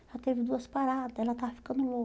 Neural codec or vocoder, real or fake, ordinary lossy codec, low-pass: none; real; none; none